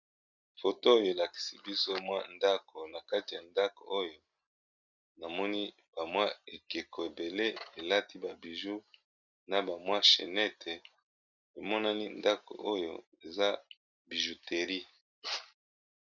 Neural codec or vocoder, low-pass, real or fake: none; 7.2 kHz; real